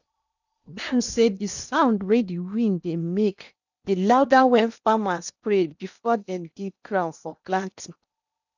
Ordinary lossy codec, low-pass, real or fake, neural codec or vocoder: none; 7.2 kHz; fake; codec, 16 kHz in and 24 kHz out, 0.8 kbps, FocalCodec, streaming, 65536 codes